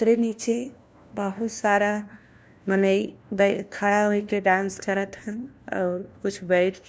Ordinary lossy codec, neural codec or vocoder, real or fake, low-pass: none; codec, 16 kHz, 1 kbps, FunCodec, trained on LibriTTS, 50 frames a second; fake; none